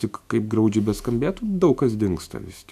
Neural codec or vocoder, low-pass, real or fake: autoencoder, 48 kHz, 128 numbers a frame, DAC-VAE, trained on Japanese speech; 14.4 kHz; fake